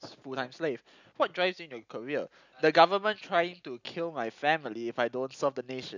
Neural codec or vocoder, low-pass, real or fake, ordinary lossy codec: none; 7.2 kHz; real; none